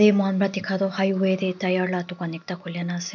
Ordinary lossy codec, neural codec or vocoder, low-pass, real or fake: none; none; 7.2 kHz; real